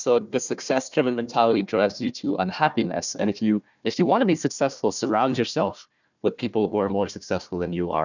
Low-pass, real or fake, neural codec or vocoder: 7.2 kHz; fake; codec, 16 kHz, 1 kbps, FunCodec, trained on Chinese and English, 50 frames a second